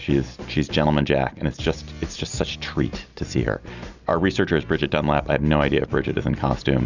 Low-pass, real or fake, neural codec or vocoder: 7.2 kHz; real; none